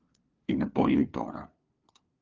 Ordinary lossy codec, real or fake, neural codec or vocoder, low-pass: Opus, 24 kbps; fake; codec, 16 kHz, 2 kbps, FunCodec, trained on LibriTTS, 25 frames a second; 7.2 kHz